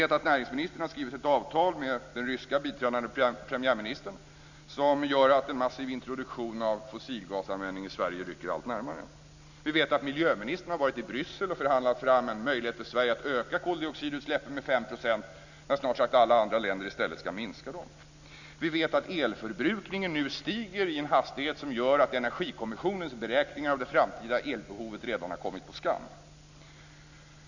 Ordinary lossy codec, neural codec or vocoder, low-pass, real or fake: none; none; 7.2 kHz; real